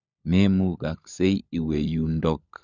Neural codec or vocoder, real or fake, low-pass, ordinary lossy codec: codec, 16 kHz, 16 kbps, FunCodec, trained on LibriTTS, 50 frames a second; fake; 7.2 kHz; none